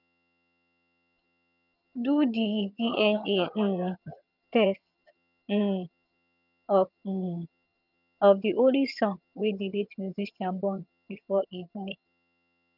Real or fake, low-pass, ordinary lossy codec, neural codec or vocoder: fake; 5.4 kHz; none; vocoder, 22.05 kHz, 80 mel bands, HiFi-GAN